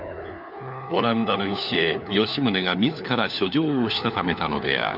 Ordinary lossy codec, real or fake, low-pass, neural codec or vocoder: none; fake; 5.4 kHz; codec, 16 kHz, 4 kbps, FunCodec, trained on LibriTTS, 50 frames a second